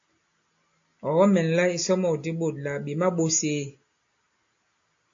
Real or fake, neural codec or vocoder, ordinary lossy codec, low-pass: real; none; AAC, 48 kbps; 7.2 kHz